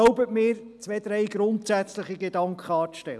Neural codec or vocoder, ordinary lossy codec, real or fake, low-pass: none; none; real; none